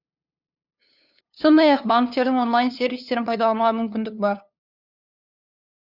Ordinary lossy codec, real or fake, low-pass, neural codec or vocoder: none; fake; 5.4 kHz; codec, 16 kHz, 2 kbps, FunCodec, trained on LibriTTS, 25 frames a second